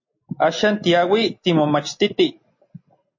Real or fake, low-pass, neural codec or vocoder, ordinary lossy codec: real; 7.2 kHz; none; MP3, 32 kbps